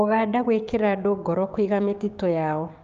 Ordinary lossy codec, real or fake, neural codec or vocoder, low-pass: Opus, 32 kbps; fake; codec, 16 kHz, 6 kbps, DAC; 7.2 kHz